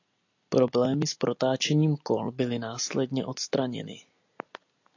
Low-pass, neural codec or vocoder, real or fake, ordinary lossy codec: 7.2 kHz; none; real; AAC, 48 kbps